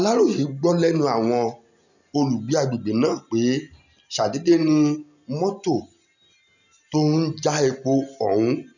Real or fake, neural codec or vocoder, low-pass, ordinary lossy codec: real; none; 7.2 kHz; none